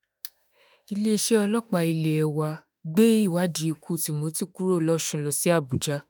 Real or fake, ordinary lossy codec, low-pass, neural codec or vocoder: fake; none; none; autoencoder, 48 kHz, 32 numbers a frame, DAC-VAE, trained on Japanese speech